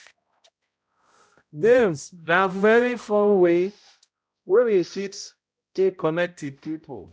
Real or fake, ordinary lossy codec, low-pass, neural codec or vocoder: fake; none; none; codec, 16 kHz, 0.5 kbps, X-Codec, HuBERT features, trained on balanced general audio